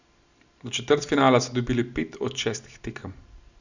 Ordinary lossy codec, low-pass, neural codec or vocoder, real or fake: none; 7.2 kHz; none; real